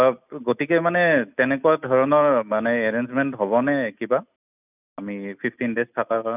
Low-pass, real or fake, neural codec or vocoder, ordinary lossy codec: 3.6 kHz; real; none; none